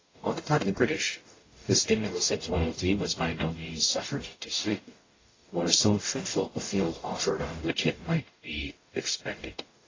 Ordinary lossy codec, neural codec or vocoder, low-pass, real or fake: AAC, 32 kbps; codec, 44.1 kHz, 0.9 kbps, DAC; 7.2 kHz; fake